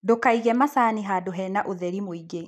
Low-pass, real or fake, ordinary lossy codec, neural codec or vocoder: 14.4 kHz; real; none; none